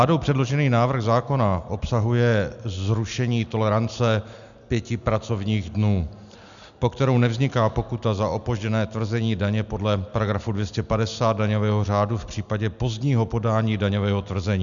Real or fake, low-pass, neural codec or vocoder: real; 7.2 kHz; none